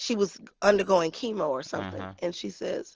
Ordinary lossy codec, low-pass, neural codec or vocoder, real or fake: Opus, 16 kbps; 7.2 kHz; none; real